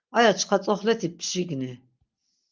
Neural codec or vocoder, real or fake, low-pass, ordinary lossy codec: none; real; 7.2 kHz; Opus, 24 kbps